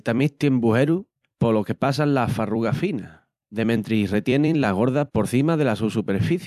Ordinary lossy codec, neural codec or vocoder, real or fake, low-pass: MP3, 96 kbps; vocoder, 44.1 kHz, 128 mel bands every 256 samples, BigVGAN v2; fake; 14.4 kHz